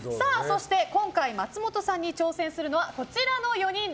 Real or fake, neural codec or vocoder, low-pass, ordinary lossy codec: real; none; none; none